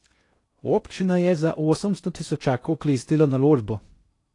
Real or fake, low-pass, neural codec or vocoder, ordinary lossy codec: fake; 10.8 kHz; codec, 16 kHz in and 24 kHz out, 0.6 kbps, FocalCodec, streaming, 2048 codes; AAC, 48 kbps